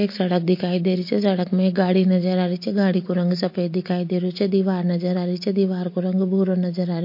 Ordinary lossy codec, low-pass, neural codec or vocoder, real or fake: MP3, 32 kbps; 5.4 kHz; none; real